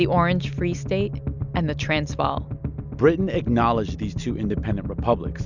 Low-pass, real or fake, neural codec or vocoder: 7.2 kHz; real; none